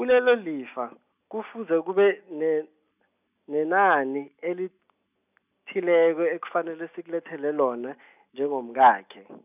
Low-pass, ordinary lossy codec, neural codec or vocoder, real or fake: 3.6 kHz; none; none; real